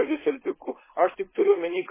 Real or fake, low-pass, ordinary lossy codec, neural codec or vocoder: fake; 3.6 kHz; MP3, 16 kbps; codec, 16 kHz, 4.8 kbps, FACodec